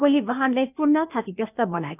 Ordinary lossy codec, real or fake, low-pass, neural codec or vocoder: none; fake; 3.6 kHz; codec, 16 kHz, 0.8 kbps, ZipCodec